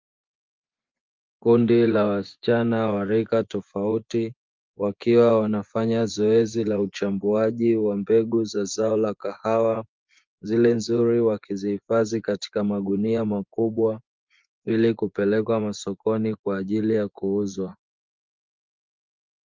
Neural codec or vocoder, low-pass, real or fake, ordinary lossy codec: vocoder, 24 kHz, 100 mel bands, Vocos; 7.2 kHz; fake; Opus, 24 kbps